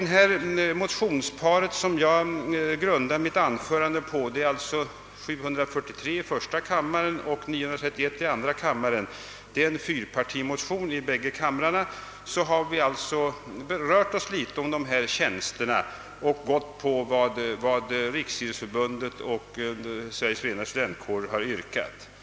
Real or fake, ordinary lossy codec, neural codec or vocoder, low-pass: real; none; none; none